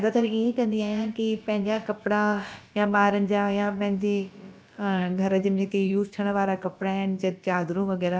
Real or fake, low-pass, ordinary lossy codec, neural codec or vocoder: fake; none; none; codec, 16 kHz, about 1 kbps, DyCAST, with the encoder's durations